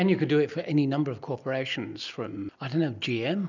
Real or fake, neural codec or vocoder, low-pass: real; none; 7.2 kHz